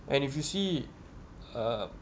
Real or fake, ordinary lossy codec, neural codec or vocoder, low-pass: real; none; none; none